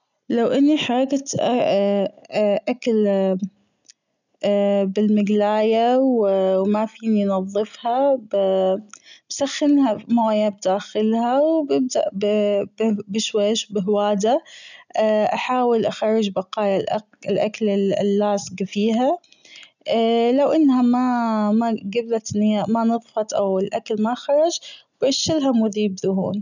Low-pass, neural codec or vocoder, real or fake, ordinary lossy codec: 7.2 kHz; none; real; none